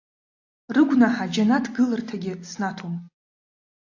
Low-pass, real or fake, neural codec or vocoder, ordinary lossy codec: 7.2 kHz; real; none; AAC, 48 kbps